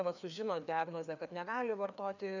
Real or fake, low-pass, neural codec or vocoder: fake; 7.2 kHz; codec, 16 kHz, 2 kbps, FreqCodec, larger model